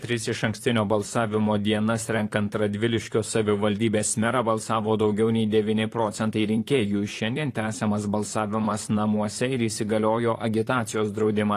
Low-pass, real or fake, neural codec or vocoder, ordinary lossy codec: 14.4 kHz; fake; vocoder, 44.1 kHz, 128 mel bands, Pupu-Vocoder; AAC, 48 kbps